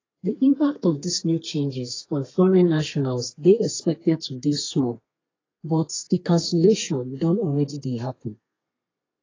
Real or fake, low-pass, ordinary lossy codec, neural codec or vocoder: fake; 7.2 kHz; AAC, 32 kbps; codec, 32 kHz, 1.9 kbps, SNAC